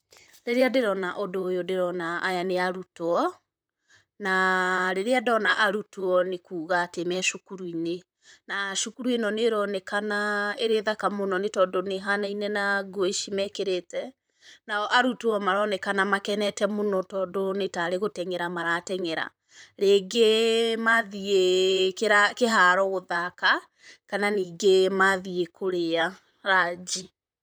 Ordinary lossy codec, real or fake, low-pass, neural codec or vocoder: none; fake; none; vocoder, 44.1 kHz, 128 mel bands, Pupu-Vocoder